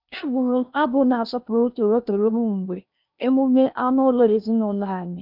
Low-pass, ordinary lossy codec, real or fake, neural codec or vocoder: 5.4 kHz; none; fake; codec, 16 kHz in and 24 kHz out, 0.8 kbps, FocalCodec, streaming, 65536 codes